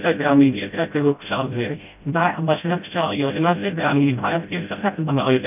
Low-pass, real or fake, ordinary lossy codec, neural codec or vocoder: 3.6 kHz; fake; none; codec, 16 kHz, 0.5 kbps, FreqCodec, smaller model